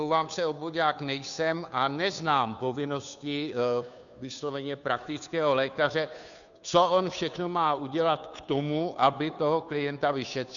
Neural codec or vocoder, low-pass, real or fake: codec, 16 kHz, 2 kbps, FunCodec, trained on Chinese and English, 25 frames a second; 7.2 kHz; fake